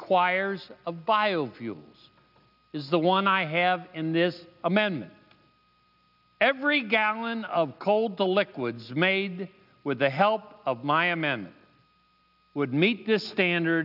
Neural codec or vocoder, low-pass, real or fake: none; 5.4 kHz; real